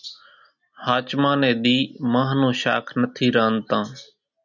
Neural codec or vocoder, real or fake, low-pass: none; real; 7.2 kHz